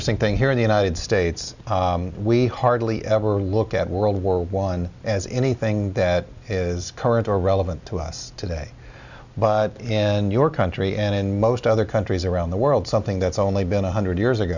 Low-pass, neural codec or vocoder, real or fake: 7.2 kHz; none; real